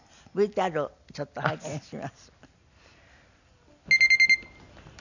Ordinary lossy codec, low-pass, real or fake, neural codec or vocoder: none; 7.2 kHz; real; none